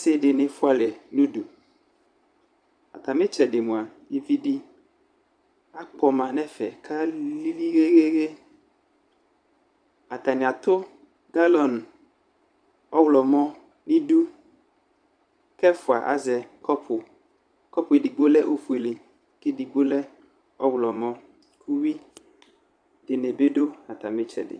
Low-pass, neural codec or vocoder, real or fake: 9.9 kHz; vocoder, 22.05 kHz, 80 mel bands, Vocos; fake